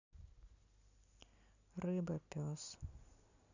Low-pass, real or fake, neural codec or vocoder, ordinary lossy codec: 7.2 kHz; real; none; none